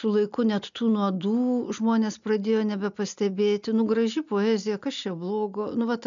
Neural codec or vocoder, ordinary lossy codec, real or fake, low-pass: none; MP3, 96 kbps; real; 7.2 kHz